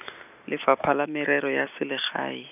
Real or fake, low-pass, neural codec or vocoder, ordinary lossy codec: real; 3.6 kHz; none; none